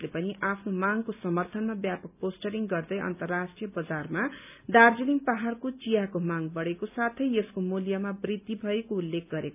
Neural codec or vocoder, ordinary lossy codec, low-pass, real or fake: none; none; 3.6 kHz; real